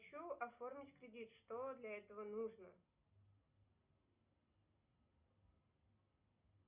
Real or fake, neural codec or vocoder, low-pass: real; none; 3.6 kHz